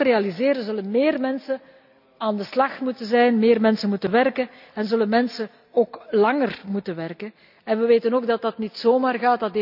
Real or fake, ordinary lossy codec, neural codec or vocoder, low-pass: real; none; none; 5.4 kHz